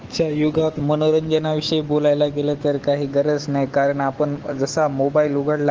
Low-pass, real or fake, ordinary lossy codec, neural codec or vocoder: 7.2 kHz; fake; Opus, 16 kbps; autoencoder, 48 kHz, 128 numbers a frame, DAC-VAE, trained on Japanese speech